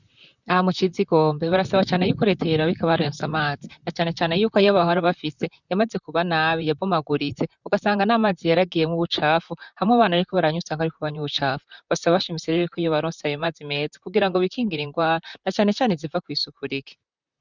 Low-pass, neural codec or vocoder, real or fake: 7.2 kHz; vocoder, 44.1 kHz, 128 mel bands, Pupu-Vocoder; fake